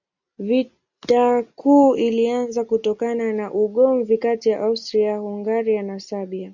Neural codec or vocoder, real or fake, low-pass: none; real; 7.2 kHz